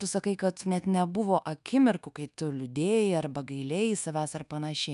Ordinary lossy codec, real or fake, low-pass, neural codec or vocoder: MP3, 96 kbps; fake; 10.8 kHz; codec, 24 kHz, 1.2 kbps, DualCodec